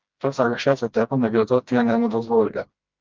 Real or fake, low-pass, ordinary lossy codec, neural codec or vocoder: fake; 7.2 kHz; Opus, 32 kbps; codec, 16 kHz, 1 kbps, FreqCodec, smaller model